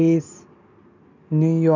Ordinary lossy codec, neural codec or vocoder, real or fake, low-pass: none; none; real; 7.2 kHz